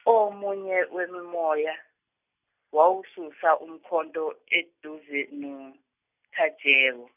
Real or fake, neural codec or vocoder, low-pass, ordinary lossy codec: real; none; 3.6 kHz; none